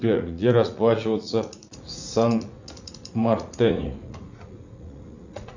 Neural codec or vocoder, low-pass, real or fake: vocoder, 44.1 kHz, 80 mel bands, Vocos; 7.2 kHz; fake